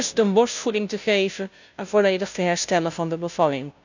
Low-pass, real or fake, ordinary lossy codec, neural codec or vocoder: 7.2 kHz; fake; none; codec, 16 kHz, 0.5 kbps, FunCodec, trained on Chinese and English, 25 frames a second